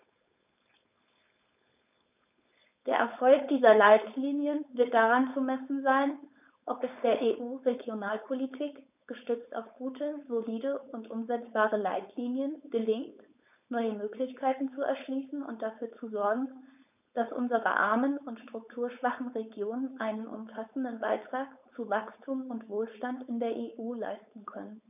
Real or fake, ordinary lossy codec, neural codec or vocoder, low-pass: fake; none; codec, 16 kHz, 4.8 kbps, FACodec; 3.6 kHz